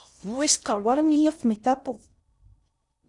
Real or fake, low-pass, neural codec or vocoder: fake; 10.8 kHz; codec, 16 kHz in and 24 kHz out, 0.6 kbps, FocalCodec, streaming, 4096 codes